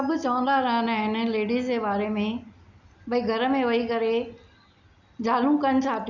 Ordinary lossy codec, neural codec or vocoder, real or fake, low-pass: none; none; real; 7.2 kHz